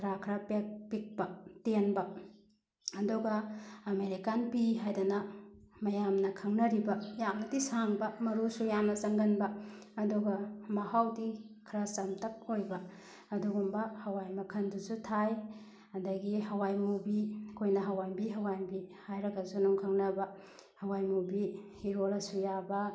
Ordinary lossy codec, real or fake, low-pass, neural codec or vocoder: none; real; none; none